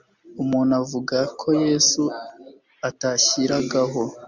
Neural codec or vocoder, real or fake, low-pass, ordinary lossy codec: none; real; 7.2 kHz; Opus, 64 kbps